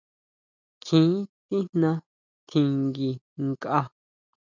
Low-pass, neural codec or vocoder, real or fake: 7.2 kHz; none; real